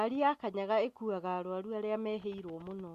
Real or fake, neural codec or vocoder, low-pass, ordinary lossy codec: real; none; 14.4 kHz; Opus, 64 kbps